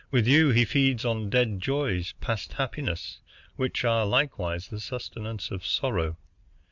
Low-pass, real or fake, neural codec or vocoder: 7.2 kHz; real; none